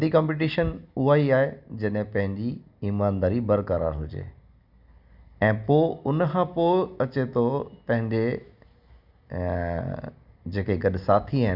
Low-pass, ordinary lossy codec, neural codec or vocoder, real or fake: 5.4 kHz; Opus, 64 kbps; none; real